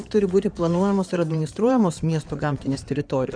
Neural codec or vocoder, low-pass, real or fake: codec, 16 kHz in and 24 kHz out, 2.2 kbps, FireRedTTS-2 codec; 9.9 kHz; fake